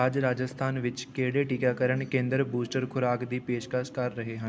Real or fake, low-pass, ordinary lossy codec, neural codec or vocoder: real; none; none; none